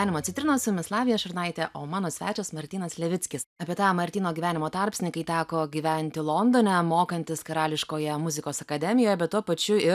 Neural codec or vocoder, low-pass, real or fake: none; 14.4 kHz; real